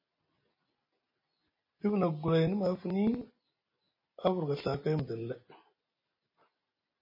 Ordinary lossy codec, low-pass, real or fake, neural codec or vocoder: MP3, 24 kbps; 5.4 kHz; real; none